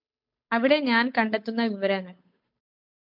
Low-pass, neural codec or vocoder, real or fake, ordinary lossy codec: 5.4 kHz; codec, 16 kHz, 8 kbps, FunCodec, trained on Chinese and English, 25 frames a second; fake; MP3, 48 kbps